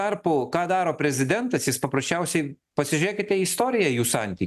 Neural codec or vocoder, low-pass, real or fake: none; 14.4 kHz; real